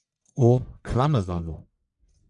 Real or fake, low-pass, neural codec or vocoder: fake; 10.8 kHz; codec, 44.1 kHz, 1.7 kbps, Pupu-Codec